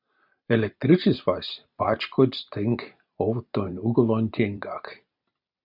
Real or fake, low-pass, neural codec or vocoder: fake; 5.4 kHz; vocoder, 44.1 kHz, 128 mel bands every 256 samples, BigVGAN v2